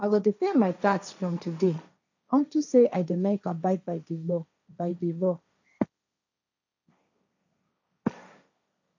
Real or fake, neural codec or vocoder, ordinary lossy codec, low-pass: fake; codec, 16 kHz, 1.1 kbps, Voila-Tokenizer; none; 7.2 kHz